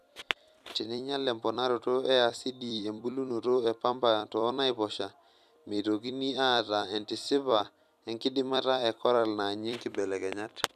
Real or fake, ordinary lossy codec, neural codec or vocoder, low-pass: fake; none; vocoder, 44.1 kHz, 128 mel bands every 256 samples, BigVGAN v2; 14.4 kHz